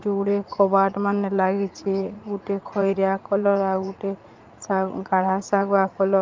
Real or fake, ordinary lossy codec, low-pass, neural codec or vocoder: fake; Opus, 24 kbps; 7.2 kHz; vocoder, 44.1 kHz, 80 mel bands, Vocos